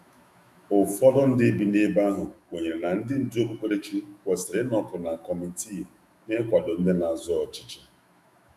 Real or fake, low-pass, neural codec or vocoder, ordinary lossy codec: fake; 14.4 kHz; autoencoder, 48 kHz, 128 numbers a frame, DAC-VAE, trained on Japanese speech; none